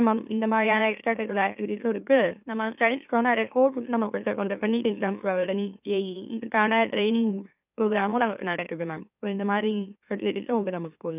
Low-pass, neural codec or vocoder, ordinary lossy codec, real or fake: 3.6 kHz; autoencoder, 44.1 kHz, a latent of 192 numbers a frame, MeloTTS; none; fake